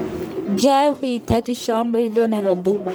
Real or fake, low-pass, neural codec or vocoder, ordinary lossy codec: fake; none; codec, 44.1 kHz, 1.7 kbps, Pupu-Codec; none